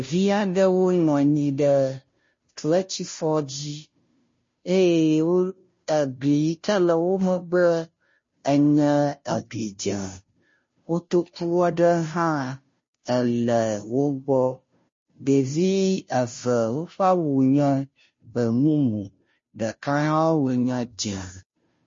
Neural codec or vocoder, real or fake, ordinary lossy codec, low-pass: codec, 16 kHz, 0.5 kbps, FunCodec, trained on Chinese and English, 25 frames a second; fake; MP3, 32 kbps; 7.2 kHz